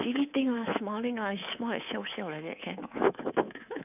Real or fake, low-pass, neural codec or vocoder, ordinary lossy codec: fake; 3.6 kHz; codec, 24 kHz, 3.1 kbps, DualCodec; none